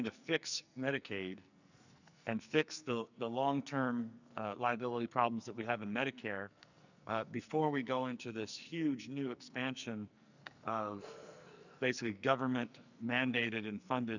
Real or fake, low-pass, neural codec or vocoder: fake; 7.2 kHz; codec, 44.1 kHz, 2.6 kbps, SNAC